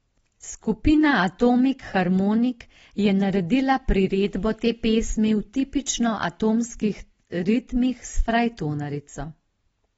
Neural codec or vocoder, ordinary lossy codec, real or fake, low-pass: none; AAC, 24 kbps; real; 19.8 kHz